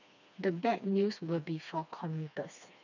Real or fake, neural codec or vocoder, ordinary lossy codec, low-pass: fake; codec, 16 kHz, 2 kbps, FreqCodec, smaller model; none; 7.2 kHz